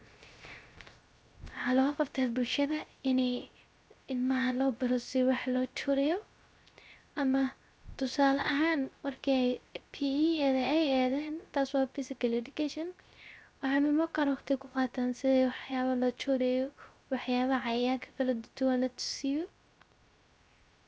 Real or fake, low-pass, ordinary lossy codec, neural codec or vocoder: fake; none; none; codec, 16 kHz, 0.3 kbps, FocalCodec